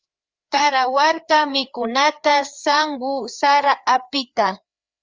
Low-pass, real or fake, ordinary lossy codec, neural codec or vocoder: 7.2 kHz; fake; Opus, 32 kbps; codec, 16 kHz, 4 kbps, FreqCodec, larger model